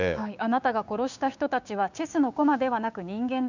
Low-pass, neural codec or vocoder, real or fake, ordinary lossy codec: 7.2 kHz; codec, 16 kHz, 6 kbps, DAC; fake; none